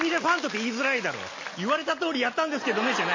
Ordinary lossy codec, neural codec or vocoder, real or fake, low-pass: MP3, 32 kbps; none; real; 7.2 kHz